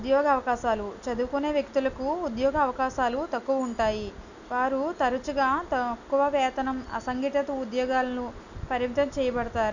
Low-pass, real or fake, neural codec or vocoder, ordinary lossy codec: 7.2 kHz; real; none; none